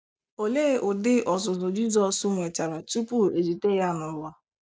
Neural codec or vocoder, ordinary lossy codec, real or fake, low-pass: none; none; real; none